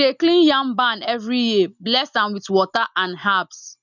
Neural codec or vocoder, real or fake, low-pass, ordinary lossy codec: none; real; 7.2 kHz; none